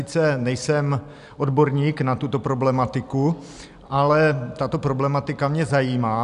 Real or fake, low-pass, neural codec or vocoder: real; 10.8 kHz; none